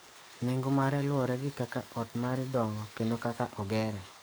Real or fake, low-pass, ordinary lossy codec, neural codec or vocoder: fake; none; none; codec, 44.1 kHz, 7.8 kbps, DAC